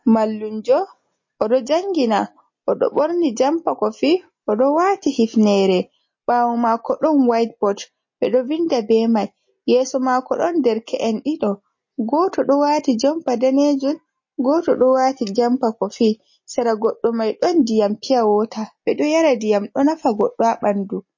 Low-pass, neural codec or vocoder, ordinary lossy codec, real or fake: 7.2 kHz; none; MP3, 32 kbps; real